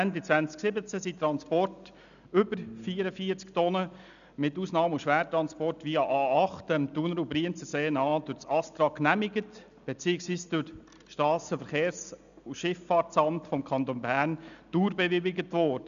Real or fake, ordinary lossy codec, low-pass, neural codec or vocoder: real; none; 7.2 kHz; none